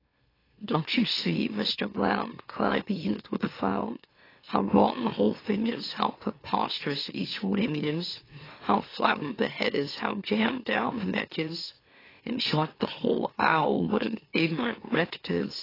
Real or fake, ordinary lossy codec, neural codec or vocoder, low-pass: fake; AAC, 24 kbps; autoencoder, 44.1 kHz, a latent of 192 numbers a frame, MeloTTS; 5.4 kHz